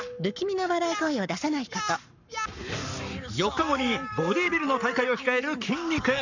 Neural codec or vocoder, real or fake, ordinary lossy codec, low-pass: codec, 44.1 kHz, 7.8 kbps, Pupu-Codec; fake; none; 7.2 kHz